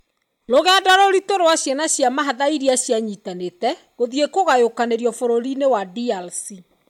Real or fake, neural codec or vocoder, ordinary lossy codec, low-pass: real; none; MP3, 96 kbps; 19.8 kHz